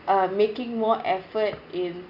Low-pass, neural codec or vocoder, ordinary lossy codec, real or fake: 5.4 kHz; none; none; real